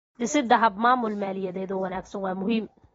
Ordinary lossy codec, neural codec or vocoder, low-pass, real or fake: AAC, 24 kbps; vocoder, 44.1 kHz, 128 mel bands every 256 samples, BigVGAN v2; 19.8 kHz; fake